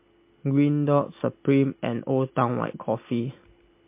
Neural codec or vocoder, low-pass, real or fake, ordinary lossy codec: none; 3.6 kHz; real; MP3, 24 kbps